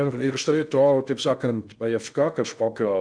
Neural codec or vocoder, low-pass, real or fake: codec, 16 kHz in and 24 kHz out, 0.6 kbps, FocalCodec, streaming, 2048 codes; 9.9 kHz; fake